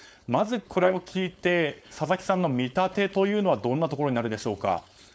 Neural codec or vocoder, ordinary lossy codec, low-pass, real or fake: codec, 16 kHz, 4.8 kbps, FACodec; none; none; fake